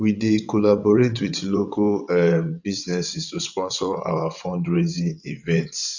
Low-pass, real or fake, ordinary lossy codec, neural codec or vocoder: 7.2 kHz; fake; none; vocoder, 22.05 kHz, 80 mel bands, WaveNeXt